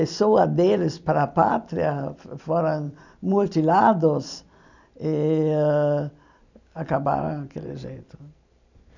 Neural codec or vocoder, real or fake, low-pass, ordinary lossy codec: none; real; 7.2 kHz; none